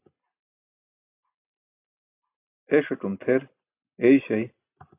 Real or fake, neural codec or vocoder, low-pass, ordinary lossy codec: real; none; 3.6 kHz; AAC, 32 kbps